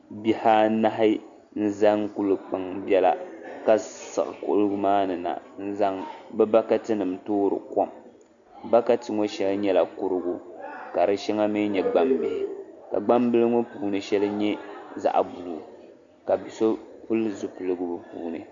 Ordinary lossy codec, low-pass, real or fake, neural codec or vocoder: Opus, 64 kbps; 7.2 kHz; real; none